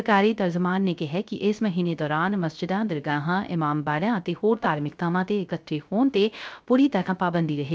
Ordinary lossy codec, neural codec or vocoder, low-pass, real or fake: none; codec, 16 kHz, 0.3 kbps, FocalCodec; none; fake